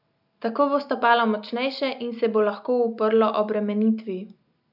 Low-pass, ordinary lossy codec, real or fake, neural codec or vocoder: 5.4 kHz; none; real; none